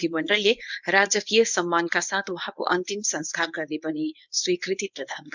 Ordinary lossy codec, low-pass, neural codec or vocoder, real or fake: none; 7.2 kHz; codec, 24 kHz, 0.9 kbps, WavTokenizer, medium speech release version 1; fake